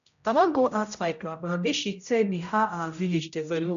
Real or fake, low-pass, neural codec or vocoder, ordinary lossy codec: fake; 7.2 kHz; codec, 16 kHz, 0.5 kbps, X-Codec, HuBERT features, trained on general audio; none